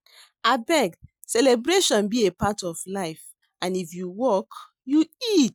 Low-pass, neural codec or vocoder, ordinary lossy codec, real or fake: none; none; none; real